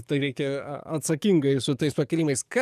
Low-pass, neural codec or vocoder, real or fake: 14.4 kHz; codec, 44.1 kHz, 7.8 kbps, DAC; fake